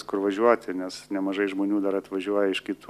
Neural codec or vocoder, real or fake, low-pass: none; real; 14.4 kHz